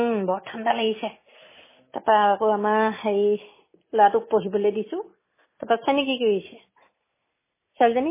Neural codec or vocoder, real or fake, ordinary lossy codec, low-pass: none; real; MP3, 16 kbps; 3.6 kHz